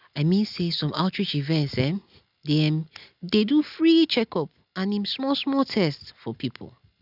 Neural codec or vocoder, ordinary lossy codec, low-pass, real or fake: none; none; 5.4 kHz; real